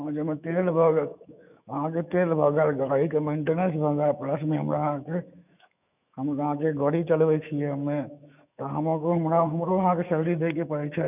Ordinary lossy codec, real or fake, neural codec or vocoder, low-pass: none; fake; autoencoder, 48 kHz, 128 numbers a frame, DAC-VAE, trained on Japanese speech; 3.6 kHz